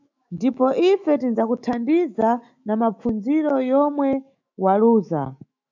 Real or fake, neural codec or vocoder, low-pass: fake; autoencoder, 48 kHz, 128 numbers a frame, DAC-VAE, trained on Japanese speech; 7.2 kHz